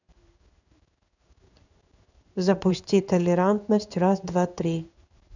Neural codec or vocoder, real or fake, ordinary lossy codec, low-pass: codec, 16 kHz in and 24 kHz out, 1 kbps, XY-Tokenizer; fake; none; 7.2 kHz